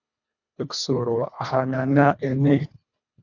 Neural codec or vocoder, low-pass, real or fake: codec, 24 kHz, 1.5 kbps, HILCodec; 7.2 kHz; fake